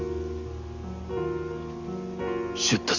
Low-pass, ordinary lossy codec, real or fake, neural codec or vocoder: 7.2 kHz; none; real; none